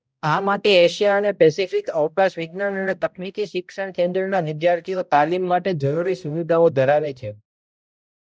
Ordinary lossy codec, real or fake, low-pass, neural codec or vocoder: none; fake; none; codec, 16 kHz, 0.5 kbps, X-Codec, HuBERT features, trained on balanced general audio